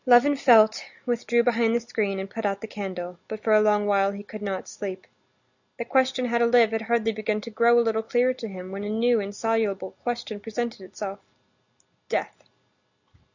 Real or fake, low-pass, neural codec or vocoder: real; 7.2 kHz; none